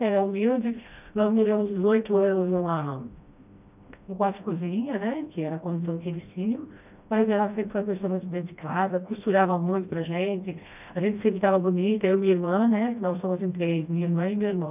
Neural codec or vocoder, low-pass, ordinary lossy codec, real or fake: codec, 16 kHz, 1 kbps, FreqCodec, smaller model; 3.6 kHz; none; fake